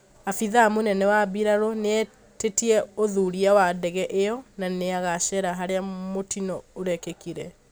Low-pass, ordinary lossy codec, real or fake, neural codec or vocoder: none; none; real; none